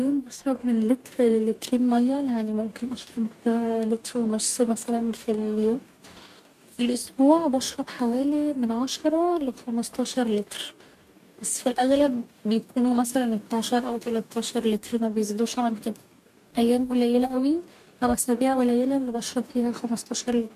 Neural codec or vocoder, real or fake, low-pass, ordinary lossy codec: codec, 44.1 kHz, 2.6 kbps, DAC; fake; 14.4 kHz; MP3, 96 kbps